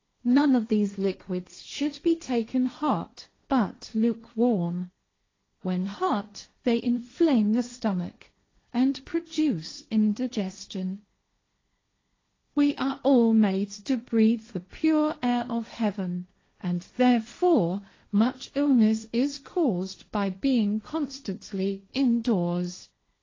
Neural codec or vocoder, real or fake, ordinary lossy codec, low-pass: codec, 16 kHz, 1.1 kbps, Voila-Tokenizer; fake; AAC, 32 kbps; 7.2 kHz